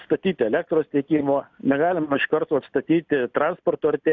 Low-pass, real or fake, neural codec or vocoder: 7.2 kHz; real; none